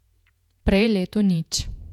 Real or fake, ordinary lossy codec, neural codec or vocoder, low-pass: fake; none; vocoder, 48 kHz, 128 mel bands, Vocos; 19.8 kHz